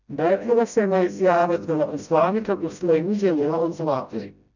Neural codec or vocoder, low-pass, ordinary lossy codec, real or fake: codec, 16 kHz, 0.5 kbps, FreqCodec, smaller model; 7.2 kHz; none; fake